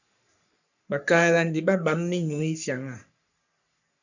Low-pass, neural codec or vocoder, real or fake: 7.2 kHz; codec, 44.1 kHz, 3.4 kbps, Pupu-Codec; fake